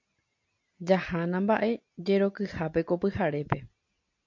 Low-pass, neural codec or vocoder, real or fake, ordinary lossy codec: 7.2 kHz; none; real; MP3, 48 kbps